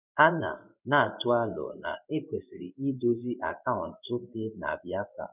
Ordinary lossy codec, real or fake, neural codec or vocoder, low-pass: none; fake; codec, 16 kHz in and 24 kHz out, 1 kbps, XY-Tokenizer; 3.6 kHz